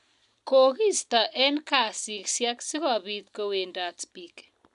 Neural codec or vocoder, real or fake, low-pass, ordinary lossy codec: none; real; 10.8 kHz; none